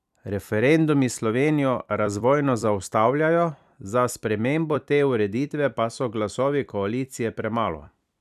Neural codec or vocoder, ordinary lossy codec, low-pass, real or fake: vocoder, 44.1 kHz, 128 mel bands every 256 samples, BigVGAN v2; none; 14.4 kHz; fake